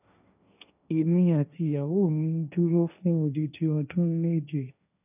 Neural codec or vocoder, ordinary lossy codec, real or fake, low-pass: codec, 16 kHz, 1.1 kbps, Voila-Tokenizer; none; fake; 3.6 kHz